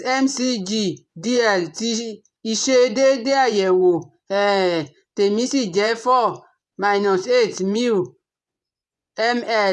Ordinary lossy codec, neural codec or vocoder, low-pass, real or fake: none; vocoder, 24 kHz, 100 mel bands, Vocos; none; fake